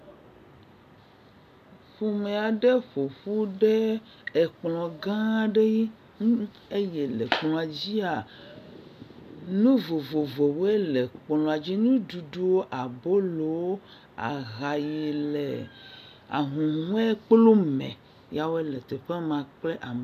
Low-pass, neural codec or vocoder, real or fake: 14.4 kHz; none; real